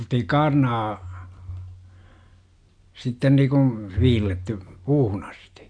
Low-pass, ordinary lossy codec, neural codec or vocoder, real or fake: 9.9 kHz; MP3, 64 kbps; none; real